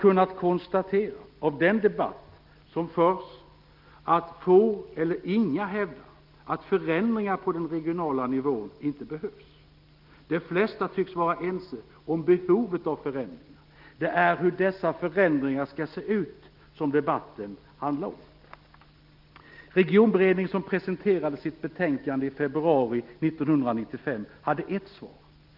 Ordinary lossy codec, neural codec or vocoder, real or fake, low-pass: Opus, 32 kbps; none; real; 5.4 kHz